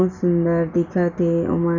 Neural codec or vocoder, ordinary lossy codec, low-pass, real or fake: none; none; 7.2 kHz; real